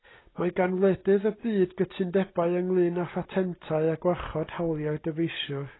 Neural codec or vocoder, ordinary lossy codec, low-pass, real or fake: none; AAC, 16 kbps; 7.2 kHz; real